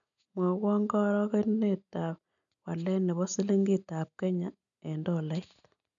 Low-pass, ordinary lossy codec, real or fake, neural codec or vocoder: 7.2 kHz; none; real; none